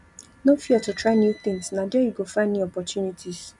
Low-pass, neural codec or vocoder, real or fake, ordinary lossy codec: 10.8 kHz; none; real; none